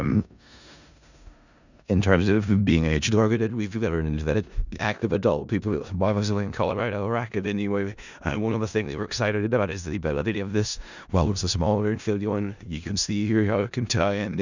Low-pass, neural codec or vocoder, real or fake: 7.2 kHz; codec, 16 kHz in and 24 kHz out, 0.4 kbps, LongCat-Audio-Codec, four codebook decoder; fake